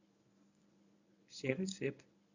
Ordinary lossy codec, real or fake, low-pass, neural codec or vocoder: none; fake; 7.2 kHz; codec, 24 kHz, 0.9 kbps, WavTokenizer, medium speech release version 1